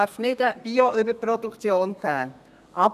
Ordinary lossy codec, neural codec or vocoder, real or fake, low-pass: none; codec, 32 kHz, 1.9 kbps, SNAC; fake; 14.4 kHz